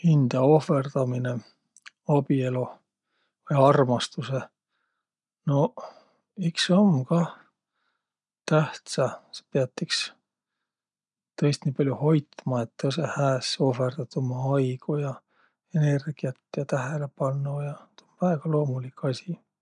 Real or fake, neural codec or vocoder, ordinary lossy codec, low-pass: real; none; none; 10.8 kHz